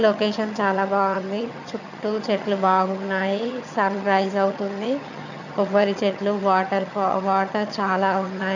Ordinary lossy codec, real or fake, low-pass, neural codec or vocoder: none; fake; 7.2 kHz; vocoder, 22.05 kHz, 80 mel bands, HiFi-GAN